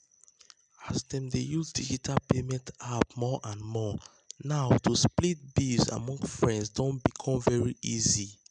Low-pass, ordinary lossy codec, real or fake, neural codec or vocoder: 9.9 kHz; none; real; none